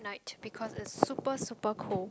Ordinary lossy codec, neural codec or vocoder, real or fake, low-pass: none; none; real; none